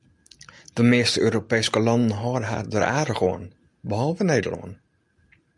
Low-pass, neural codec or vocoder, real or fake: 10.8 kHz; none; real